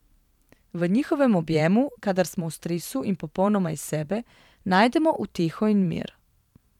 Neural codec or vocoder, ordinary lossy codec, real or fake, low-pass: vocoder, 44.1 kHz, 128 mel bands every 512 samples, BigVGAN v2; none; fake; 19.8 kHz